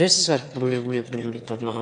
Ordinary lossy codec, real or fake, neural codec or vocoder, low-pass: AAC, 64 kbps; fake; autoencoder, 22.05 kHz, a latent of 192 numbers a frame, VITS, trained on one speaker; 9.9 kHz